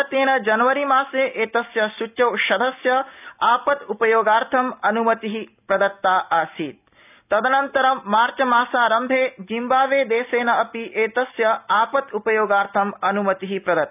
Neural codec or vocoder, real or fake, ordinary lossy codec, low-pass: none; real; none; 3.6 kHz